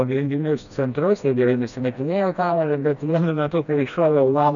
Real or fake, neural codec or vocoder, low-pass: fake; codec, 16 kHz, 1 kbps, FreqCodec, smaller model; 7.2 kHz